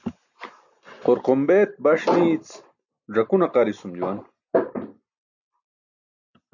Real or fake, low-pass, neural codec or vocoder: real; 7.2 kHz; none